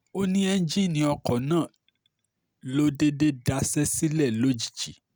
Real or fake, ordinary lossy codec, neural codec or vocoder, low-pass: real; none; none; none